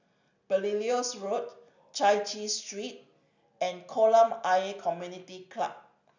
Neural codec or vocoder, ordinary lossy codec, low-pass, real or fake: none; none; 7.2 kHz; real